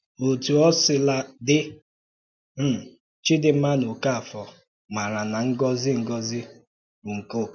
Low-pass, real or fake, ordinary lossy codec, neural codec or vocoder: 7.2 kHz; real; none; none